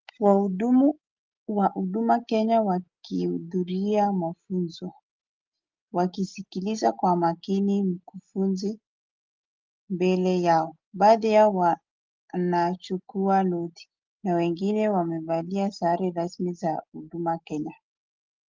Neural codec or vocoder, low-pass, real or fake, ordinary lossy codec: none; 7.2 kHz; real; Opus, 32 kbps